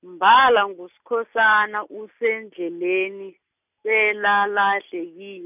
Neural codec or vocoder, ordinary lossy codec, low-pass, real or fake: none; none; 3.6 kHz; real